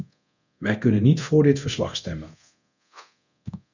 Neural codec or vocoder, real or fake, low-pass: codec, 24 kHz, 0.9 kbps, DualCodec; fake; 7.2 kHz